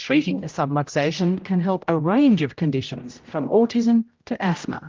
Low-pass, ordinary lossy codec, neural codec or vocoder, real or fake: 7.2 kHz; Opus, 32 kbps; codec, 16 kHz, 0.5 kbps, X-Codec, HuBERT features, trained on general audio; fake